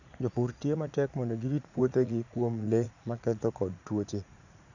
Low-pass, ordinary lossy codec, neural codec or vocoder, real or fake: 7.2 kHz; none; vocoder, 44.1 kHz, 80 mel bands, Vocos; fake